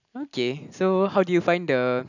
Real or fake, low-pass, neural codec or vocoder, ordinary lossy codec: real; 7.2 kHz; none; none